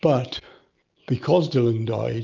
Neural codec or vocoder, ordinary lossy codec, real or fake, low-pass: none; Opus, 24 kbps; real; 7.2 kHz